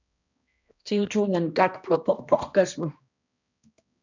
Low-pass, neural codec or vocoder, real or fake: 7.2 kHz; codec, 16 kHz, 1 kbps, X-Codec, HuBERT features, trained on balanced general audio; fake